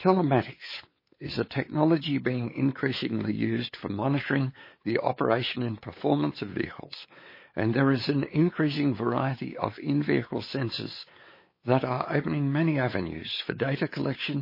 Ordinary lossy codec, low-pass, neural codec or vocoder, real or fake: MP3, 24 kbps; 5.4 kHz; codec, 16 kHz, 4 kbps, X-Codec, WavLM features, trained on Multilingual LibriSpeech; fake